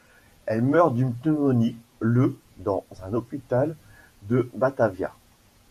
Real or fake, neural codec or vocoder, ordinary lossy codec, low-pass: fake; vocoder, 48 kHz, 128 mel bands, Vocos; MP3, 96 kbps; 14.4 kHz